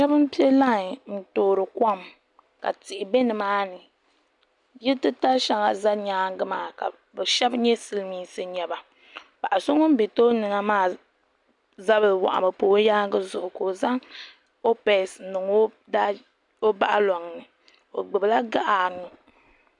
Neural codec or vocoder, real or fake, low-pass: none; real; 10.8 kHz